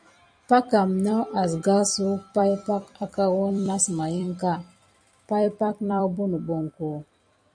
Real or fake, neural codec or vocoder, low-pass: fake; vocoder, 44.1 kHz, 128 mel bands every 256 samples, BigVGAN v2; 9.9 kHz